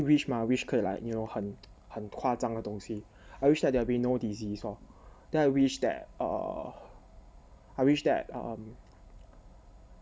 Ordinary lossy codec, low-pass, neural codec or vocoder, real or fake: none; none; none; real